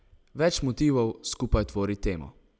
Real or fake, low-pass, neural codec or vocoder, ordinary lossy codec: real; none; none; none